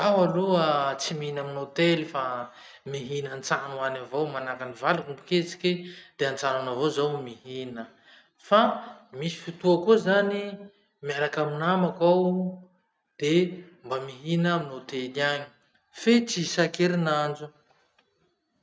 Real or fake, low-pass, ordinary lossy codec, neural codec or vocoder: real; none; none; none